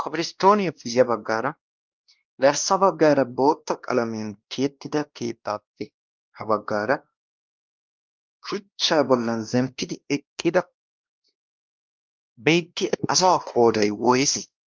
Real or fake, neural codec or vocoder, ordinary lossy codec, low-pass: fake; codec, 16 kHz, 1 kbps, X-Codec, WavLM features, trained on Multilingual LibriSpeech; Opus, 24 kbps; 7.2 kHz